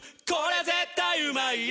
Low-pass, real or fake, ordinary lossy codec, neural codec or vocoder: none; real; none; none